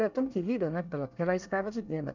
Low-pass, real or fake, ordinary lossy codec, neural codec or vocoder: 7.2 kHz; fake; none; codec, 24 kHz, 1 kbps, SNAC